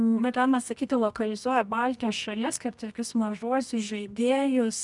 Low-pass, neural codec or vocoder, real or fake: 10.8 kHz; codec, 24 kHz, 0.9 kbps, WavTokenizer, medium music audio release; fake